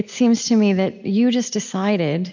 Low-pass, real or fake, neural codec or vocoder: 7.2 kHz; real; none